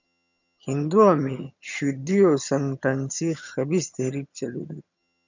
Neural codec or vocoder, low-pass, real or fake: vocoder, 22.05 kHz, 80 mel bands, HiFi-GAN; 7.2 kHz; fake